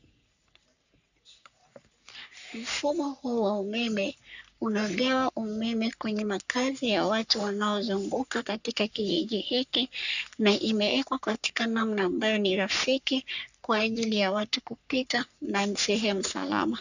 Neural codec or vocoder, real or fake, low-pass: codec, 44.1 kHz, 3.4 kbps, Pupu-Codec; fake; 7.2 kHz